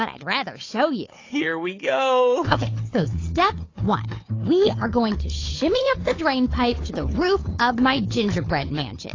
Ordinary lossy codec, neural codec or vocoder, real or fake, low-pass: AAC, 32 kbps; codec, 16 kHz, 4 kbps, FunCodec, trained on Chinese and English, 50 frames a second; fake; 7.2 kHz